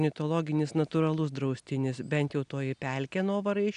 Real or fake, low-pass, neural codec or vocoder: real; 9.9 kHz; none